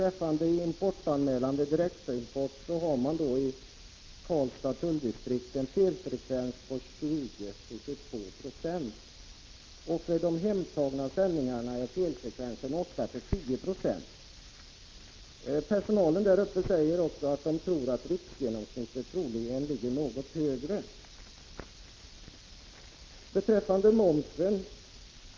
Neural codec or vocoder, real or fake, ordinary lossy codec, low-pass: none; real; Opus, 32 kbps; 7.2 kHz